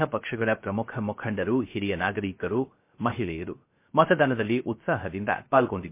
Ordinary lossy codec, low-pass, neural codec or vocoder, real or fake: MP3, 24 kbps; 3.6 kHz; codec, 16 kHz, 0.3 kbps, FocalCodec; fake